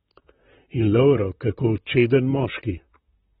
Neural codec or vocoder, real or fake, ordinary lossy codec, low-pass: vocoder, 44.1 kHz, 128 mel bands, Pupu-Vocoder; fake; AAC, 16 kbps; 19.8 kHz